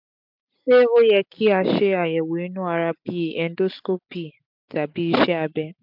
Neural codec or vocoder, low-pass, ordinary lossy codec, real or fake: none; 5.4 kHz; MP3, 48 kbps; real